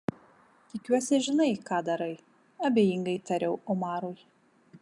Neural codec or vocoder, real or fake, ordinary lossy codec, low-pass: none; real; MP3, 96 kbps; 10.8 kHz